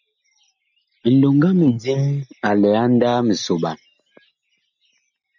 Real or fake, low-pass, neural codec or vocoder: real; 7.2 kHz; none